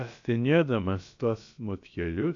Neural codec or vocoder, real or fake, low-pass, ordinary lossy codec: codec, 16 kHz, about 1 kbps, DyCAST, with the encoder's durations; fake; 7.2 kHz; AAC, 64 kbps